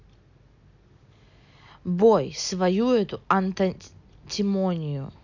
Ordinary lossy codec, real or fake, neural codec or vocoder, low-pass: none; real; none; 7.2 kHz